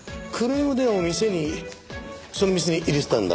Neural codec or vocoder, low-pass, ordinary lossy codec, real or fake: none; none; none; real